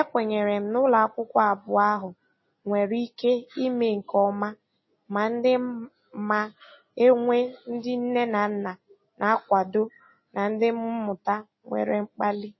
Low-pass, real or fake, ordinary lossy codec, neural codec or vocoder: 7.2 kHz; real; MP3, 24 kbps; none